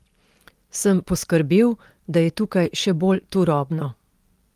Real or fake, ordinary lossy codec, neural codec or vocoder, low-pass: real; Opus, 32 kbps; none; 14.4 kHz